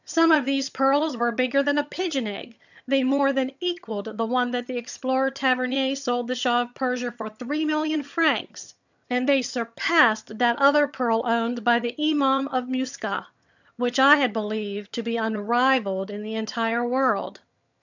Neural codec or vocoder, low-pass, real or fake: vocoder, 22.05 kHz, 80 mel bands, HiFi-GAN; 7.2 kHz; fake